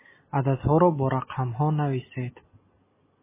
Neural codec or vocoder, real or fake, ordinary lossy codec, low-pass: none; real; MP3, 16 kbps; 3.6 kHz